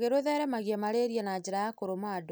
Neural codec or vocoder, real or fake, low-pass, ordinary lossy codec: none; real; none; none